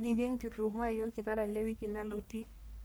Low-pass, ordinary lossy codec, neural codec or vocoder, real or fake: none; none; codec, 44.1 kHz, 1.7 kbps, Pupu-Codec; fake